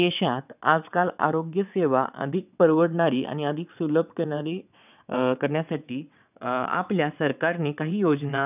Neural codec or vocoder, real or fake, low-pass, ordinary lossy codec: vocoder, 22.05 kHz, 80 mel bands, WaveNeXt; fake; 3.6 kHz; none